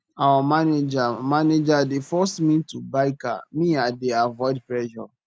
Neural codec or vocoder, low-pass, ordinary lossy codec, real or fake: none; none; none; real